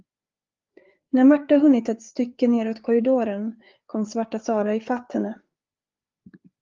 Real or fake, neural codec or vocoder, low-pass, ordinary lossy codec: fake; codec, 16 kHz, 4 kbps, FreqCodec, larger model; 7.2 kHz; Opus, 32 kbps